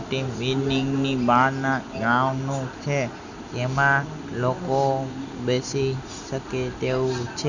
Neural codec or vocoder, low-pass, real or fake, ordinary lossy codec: none; 7.2 kHz; real; none